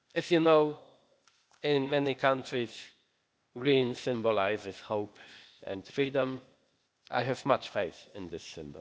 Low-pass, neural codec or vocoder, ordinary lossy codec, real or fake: none; codec, 16 kHz, 0.8 kbps, ZipCodec; none; fake